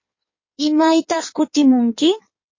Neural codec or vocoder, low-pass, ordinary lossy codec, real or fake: codec, 16 kHz in and 24 kHz out, 1.1 kbps, FireRedTTS-2 codec; 7.2 kHz; MP3, 32 kbps; fake